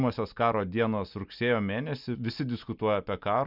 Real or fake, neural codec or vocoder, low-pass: real; none; 5.4 kHz